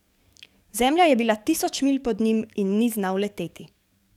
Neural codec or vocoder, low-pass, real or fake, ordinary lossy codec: codec, 44.1 kHz, 7.8 kbps, DAC; 19.8 kHz; fake; none